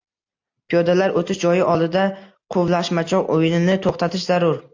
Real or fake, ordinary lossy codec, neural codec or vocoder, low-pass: real; AAC, 48 kbps; none; 7.2 kHz